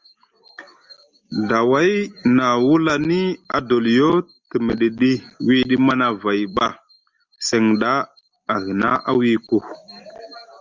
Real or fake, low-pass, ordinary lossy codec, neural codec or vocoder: real; 7.2 kHz; Opus, 32 kbps; none